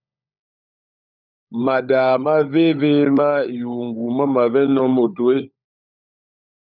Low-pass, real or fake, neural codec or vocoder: 5.4 kHz; fake; codec, 16 kHz, 16 kbps, FunCodec, trained on LibriTTS, 50 frames a second